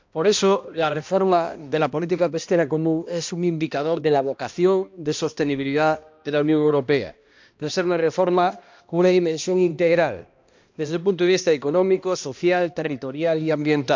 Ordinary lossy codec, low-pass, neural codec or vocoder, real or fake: MP3, 64 kbps; 7.2 kHz; codec, 16 kHz, 1 kbps, X-Codec, HuBERT features, trained on balanced general audio; fake